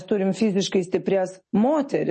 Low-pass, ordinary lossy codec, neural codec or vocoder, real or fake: 9.9 kHz; MP3, 32 kbps; none; real